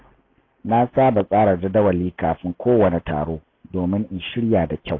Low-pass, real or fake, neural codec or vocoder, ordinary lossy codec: 7.2 kHz; real; none; AAC, 32 kbps